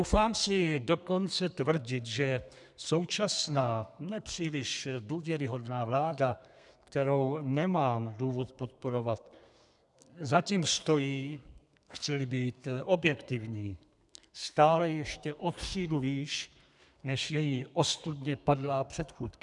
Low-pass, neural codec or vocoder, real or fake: 10.8 kHz; codec, 32 kHz, 1.9 kbps, SNAC; fake